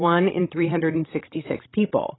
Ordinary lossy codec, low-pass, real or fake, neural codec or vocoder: AAC, 16 kbps; 7.2 kHz; fake; codec, 16 kHz, 16 kbps, FreqCodec, larger model